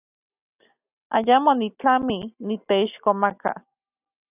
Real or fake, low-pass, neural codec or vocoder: real; 3.6 kHz; none